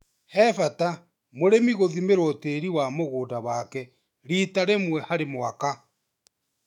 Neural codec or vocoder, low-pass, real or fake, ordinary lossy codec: autoencoder, 48 kHz, 128 numbers a frame, DAC-VAE, trained on Japanese speech; 19.8 kHz; fake; MP3, 96 kbps